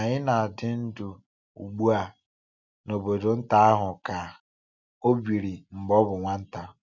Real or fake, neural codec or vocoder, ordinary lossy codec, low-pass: real; none; none; 7.2 kHz